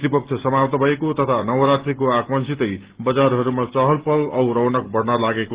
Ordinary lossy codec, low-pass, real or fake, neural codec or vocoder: Opus, 16 kbps; 3.6 kHz; real; none